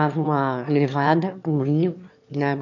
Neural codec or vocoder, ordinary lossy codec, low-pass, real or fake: autoencoder, 22.05 kHz, a latent of 192 numbers a frame, VITS, trained on one speaker; none; 7.2 kHz; fake